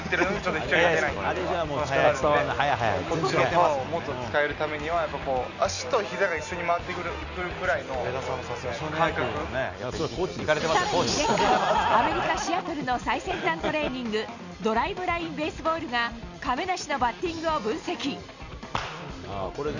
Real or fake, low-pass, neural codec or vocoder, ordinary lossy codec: real; 7.2 kHz; none; none